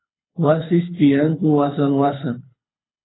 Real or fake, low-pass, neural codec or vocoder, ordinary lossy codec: fake; 7.2 kHz; codec, 24 kHz, 6 kbps, HILCodec; AAC, 16 kbps